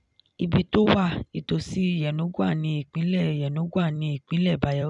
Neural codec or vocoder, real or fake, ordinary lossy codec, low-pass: vocoder, 44.1 kHz, 128 mel bands every 256 samples, BigVGAN v2; fake; none; 10.8 kHz